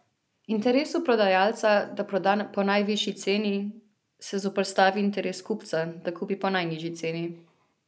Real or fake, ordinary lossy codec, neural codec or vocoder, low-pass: real; none; none; none